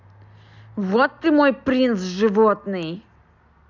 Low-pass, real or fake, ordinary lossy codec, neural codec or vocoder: 7.2 kHz; real; none; none